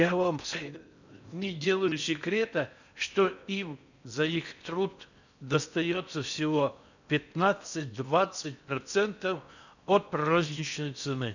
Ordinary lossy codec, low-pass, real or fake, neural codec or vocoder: none; 7.2 kHz; fake; codec, 16 kHz in and 24 kHz out, 0.6 kbps, FocalCodec, streaming, 4096 codes